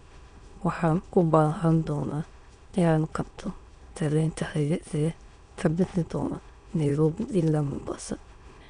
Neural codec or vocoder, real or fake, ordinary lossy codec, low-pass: autoencoder, 22.05 kHz, a latent of 192 numbers a frame, VITS, trained on many speakers; fake; MP3, 64 kbps; 9.9 kHz